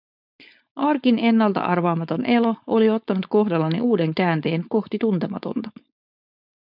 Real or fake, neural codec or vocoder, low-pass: fake; codec, 16 kHz, 4.8 kbps, FACodec; 5.4 kHz